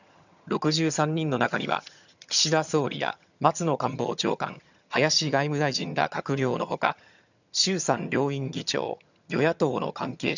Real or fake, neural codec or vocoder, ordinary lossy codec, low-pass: fake; vocoder, 22.05 kHz, 80 mel bands, HiFi-GAN; none; 7.2 kHz